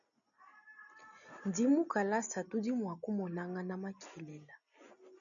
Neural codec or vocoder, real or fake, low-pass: none; real; 7.2 kHz